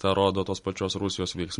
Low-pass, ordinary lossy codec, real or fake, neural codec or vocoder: 19.8 kHz; MP3, 48 kbps; real; none